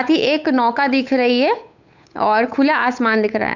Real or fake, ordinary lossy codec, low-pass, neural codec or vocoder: fake; none; 7.2 kHz; codec, 16 kHz, 8 kbps, FunCodec, trained on Chinese and English, 25 frames a second